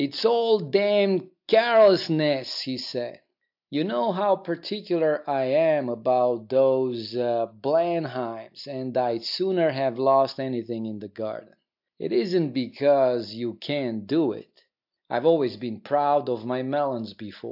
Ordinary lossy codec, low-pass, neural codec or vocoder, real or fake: AAC, 48 kbps; 5.4 kHz; none; real